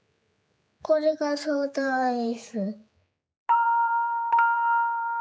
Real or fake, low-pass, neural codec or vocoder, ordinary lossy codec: fake; none; codec, 16 kHz, 4 kbps, X-Codec, HuBERT features, trained on general audio; none